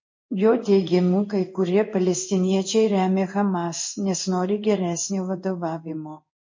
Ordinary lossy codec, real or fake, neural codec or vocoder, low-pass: MP3, 32 kbps; fake; codec, 16 kHz in and 24 kHz out, 1 kbps, XY-Tokenizer; 7.2 kHz